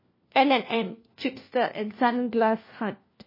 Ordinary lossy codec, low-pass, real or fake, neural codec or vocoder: MP3, 24 kbps; 5.4 kHz; fake; codec, 16 kHz, 1 kbps, FunCodec, trained on LibriTTS, 50 frames a second